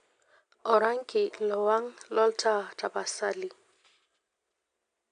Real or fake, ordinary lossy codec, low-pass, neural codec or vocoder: real; AAC, 64 kbps; 9.9 kHz; none